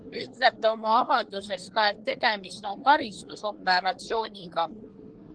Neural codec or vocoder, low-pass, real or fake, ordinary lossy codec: codec, 24 kHz, 1 kbps, SNAC; 9.9 kHz; fake; Opus, 16 kbps